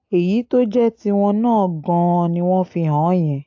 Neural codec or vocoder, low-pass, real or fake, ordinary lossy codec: none; 7.2 kHz; real; AAC, 48 kbps